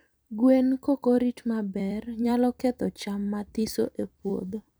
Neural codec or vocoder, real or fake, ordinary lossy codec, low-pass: vocoder, 44.1 kHz, 128 mel bands every 256 samples, BigVGAN v2; fake; none; none